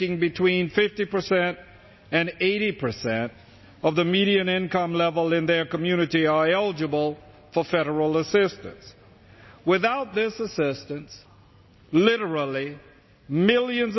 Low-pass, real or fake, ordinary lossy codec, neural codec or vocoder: 7.2 kHz; real; MP3, 24 kbps; none